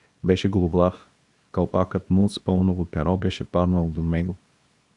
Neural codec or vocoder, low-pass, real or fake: codec, 24 kHz, 0.9 kbps, WavTokenizer, small release; 10.8 kHz; fake